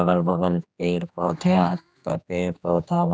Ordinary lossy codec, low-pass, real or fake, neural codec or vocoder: none; none; fake; codec, 16 kHz, 2 kbps, X-Codec, HuBERT features, trained on general audio